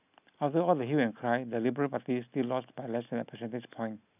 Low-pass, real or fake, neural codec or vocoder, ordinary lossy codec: 3.6 kHz; real; none; none